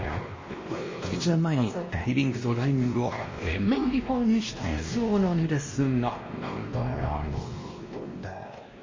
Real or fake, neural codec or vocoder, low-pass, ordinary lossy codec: fake; codec, 16 kHz, 1 kbps, X-Codec, WavLM features, trained on Multilingual LibriSpeech; 7.2 kHz; MP3, 32 kbps